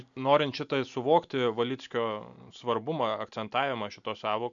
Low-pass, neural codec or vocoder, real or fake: 7.2 kHz; none; real